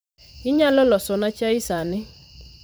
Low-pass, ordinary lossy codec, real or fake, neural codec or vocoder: none; none; fake; vocoder, 44.1 kHz, 128 mel bands every 512 samples, BigVGAN v2